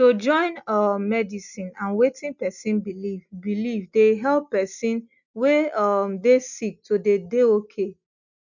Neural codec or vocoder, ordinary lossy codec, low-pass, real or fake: none; none; 7.2 kHz; real